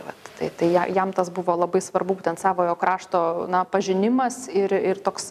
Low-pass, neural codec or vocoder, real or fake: 14.4 kHz; none; real